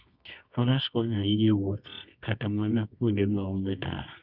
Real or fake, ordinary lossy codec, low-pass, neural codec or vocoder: fake; none; 5.4 kHz; codec, 24 kHz, 0.9 kbps, WavTokenizer, medium music audio release